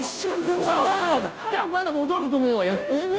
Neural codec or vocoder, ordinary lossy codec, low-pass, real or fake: codec, 16 kHz, 0.5 kbps, FunCodec, trained on Chinese and English, 25 frames a second; none; none; fake